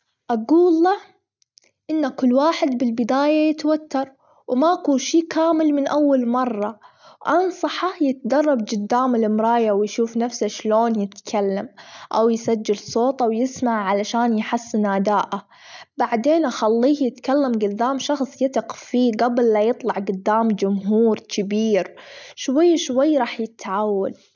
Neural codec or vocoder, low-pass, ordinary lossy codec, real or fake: none; 7.2 kHz; none; real